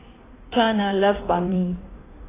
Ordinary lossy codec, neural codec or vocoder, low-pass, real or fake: AAC, 16 kbps; codec, 16 kHz in and 24 kHz out, 1.1 kbps, FireRedTTS-2 codec; 3.6 kHz; fake